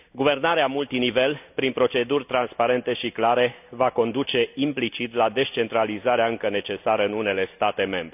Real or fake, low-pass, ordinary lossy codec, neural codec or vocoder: real; 3.6 kHz; none; none